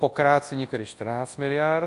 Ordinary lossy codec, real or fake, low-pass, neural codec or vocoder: AAC, 48 kbps; fake; 10.8 kHz; codec, 24 kHz, 0.9 kbps, WavTokenizer, large speech release